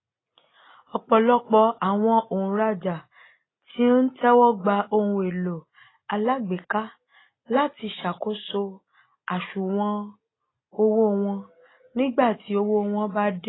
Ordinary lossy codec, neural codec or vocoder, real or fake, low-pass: AAC, 16 kbps; none; real; 7.2 kHz